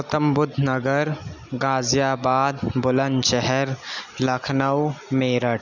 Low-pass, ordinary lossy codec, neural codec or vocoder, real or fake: 7.2 kHz; none; none; real